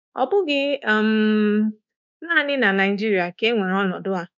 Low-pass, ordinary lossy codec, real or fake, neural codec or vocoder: 7.2 kHz; none; fake; codec, 24 kHz, 1.2 kbps, DualCodec